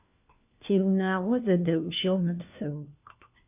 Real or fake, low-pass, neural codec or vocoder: fake; 3.6 kHz; codec, 16 kHz, 1 kbps, FunCodec, trained on LibriTTS, 50 frames a second